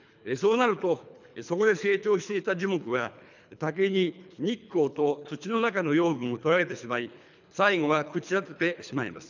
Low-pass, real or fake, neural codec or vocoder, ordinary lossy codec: 7.2 kHz; fake; codec, 24 kHz, 3 kbps, HILCodec; none